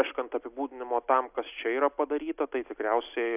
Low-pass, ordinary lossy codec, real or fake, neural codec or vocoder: 3.6 kHz; AAC, 32 kbps; real; none